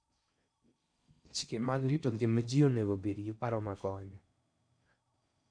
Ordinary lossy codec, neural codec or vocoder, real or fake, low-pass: none; codec, 16 kHz in and 24 kHz out, 0.6 kbps, FocalCodec, streaming, 4096 codes; fake; 9.9 kHz